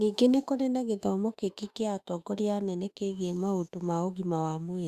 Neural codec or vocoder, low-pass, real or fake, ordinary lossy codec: autoencoder, 48 kHz, 32 numbers a frame, DAC-VAE, trained on Japanese speech; 14.4 kHz; fake; Opus, 64 kbps